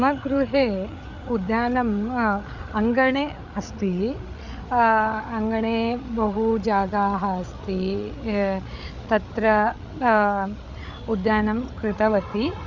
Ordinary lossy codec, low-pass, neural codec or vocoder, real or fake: Opus, 64 kbps; 7.2 kHz; codec, 16 kHz, 8 kbps, FreqCodec, larger model; fake